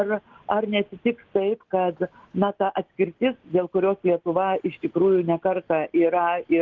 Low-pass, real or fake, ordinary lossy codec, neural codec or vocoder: 7.2 kHz; real; Opus, 16 kbps; none